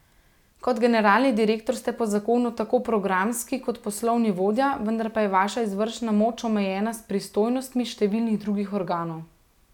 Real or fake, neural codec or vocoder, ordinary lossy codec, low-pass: real; none; none; 19.8 kHz